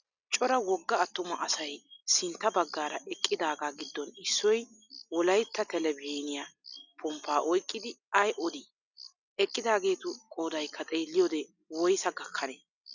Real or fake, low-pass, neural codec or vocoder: real; 7.2 kHz; none